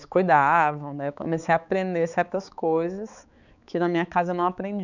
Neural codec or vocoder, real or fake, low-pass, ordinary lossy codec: codec, 16 kHz, 4 kbps, X-Codec, HuBERT features, trained on balanced general audio; fake; 7.2 kHz; none